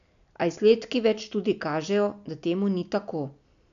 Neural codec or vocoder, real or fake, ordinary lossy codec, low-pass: none; real; none; 7.2 kHz